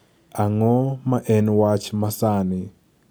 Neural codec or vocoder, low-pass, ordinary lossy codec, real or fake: none; none; none; real